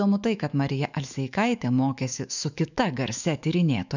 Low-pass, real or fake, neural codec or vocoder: 7.2 kHz; fake; autoencoder, 48 kHz, 128 numbers a frame, DAC-VAE, trained on Japanese speech